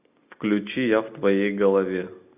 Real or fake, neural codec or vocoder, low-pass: real; none; 3.6 kHz